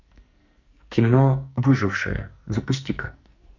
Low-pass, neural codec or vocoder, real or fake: 7.2 kHz; codec, 44.1 kHz, 2.6 kbps, SNAC; fake